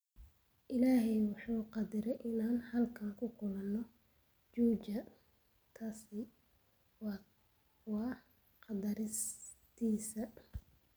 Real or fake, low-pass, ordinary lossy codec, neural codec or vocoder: real; none; none; none